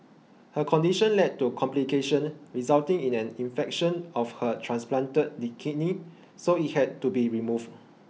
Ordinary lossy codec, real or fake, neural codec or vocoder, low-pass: none; real; none; none